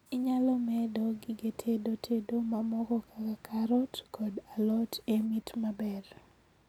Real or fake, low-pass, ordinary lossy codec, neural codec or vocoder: real; 19.8 kHz; none; none